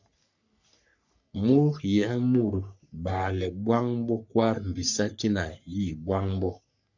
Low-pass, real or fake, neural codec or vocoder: 7.2 kHz; fake; codec, 44.1 kHz, 3.4 kbps, Pupu-Codec